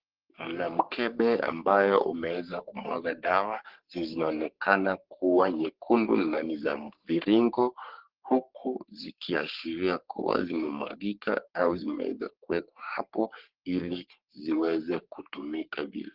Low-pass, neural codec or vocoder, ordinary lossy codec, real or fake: 5.4 kHz; codec, 44.1 kHz, 3.4 kbps, Pupu-Codec; Opus, 16 kbps; fake